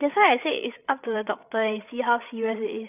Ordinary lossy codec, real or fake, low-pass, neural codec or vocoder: none; fake; 3.6 kHz; codec, 16 kHz, 8 kbps, FreqCodec, larger model